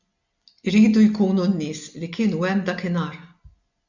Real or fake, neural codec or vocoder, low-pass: real; none; 7.2 kHz